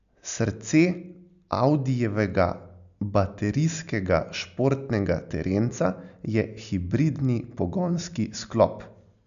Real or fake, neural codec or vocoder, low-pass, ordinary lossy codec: real; none; 7.2 kHz; none